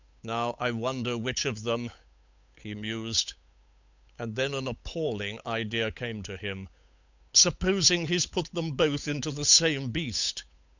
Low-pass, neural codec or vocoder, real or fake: 7.2 kHz; codec, 16 kHz, 8 kbps, FunCodec, trained on Chinese and English, 25 frames a second; fake